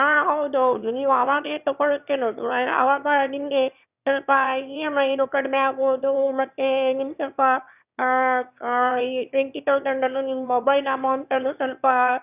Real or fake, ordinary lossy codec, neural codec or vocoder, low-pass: fake; none; autoencoder, 22.05 kHz, a latent of 192 numbers a frame, VITS, trained on one speaker; 3.6 kHz